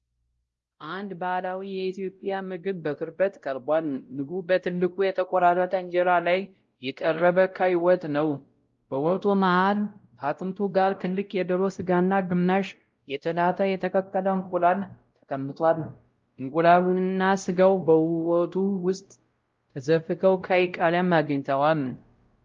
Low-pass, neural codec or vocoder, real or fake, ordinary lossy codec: 7.2 kHz; codec, 16 kHz, 0.5 kbps, X-Codec, WavLM features, trained on Multilingual LibriSpeech; fake; Opus, 32 kbps